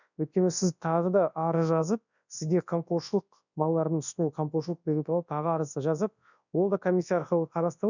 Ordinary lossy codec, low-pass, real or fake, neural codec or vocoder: none; 7.2 kHz; fake; codec, 24 kHz, 0.9 kbps, WavTokenizer, large speech release